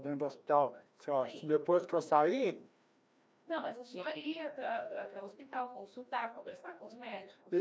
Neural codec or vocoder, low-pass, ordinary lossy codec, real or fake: codec, 16 kHz, 1 kbps, FreqCodec, larger model; none; none; fake